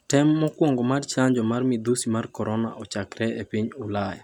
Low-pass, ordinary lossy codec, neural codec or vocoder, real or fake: 19.8 kHz; none; none; real